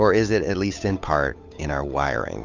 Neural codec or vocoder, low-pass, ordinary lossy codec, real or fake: codec, 16 kHz, 8 kbps, FunCodec, trained on Chinese and English, 25 frames a second; 7.2 kHz; Opus, 64 kbps; fake